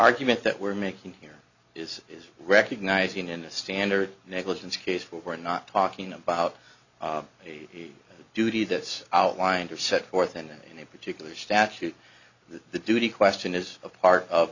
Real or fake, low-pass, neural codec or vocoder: real; 7.2 kHz; none